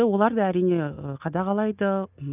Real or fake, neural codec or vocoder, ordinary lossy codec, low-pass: real; none; none; 3.6 kHz